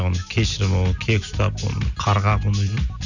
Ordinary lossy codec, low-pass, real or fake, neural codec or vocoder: none; 7.2 kHz; real; none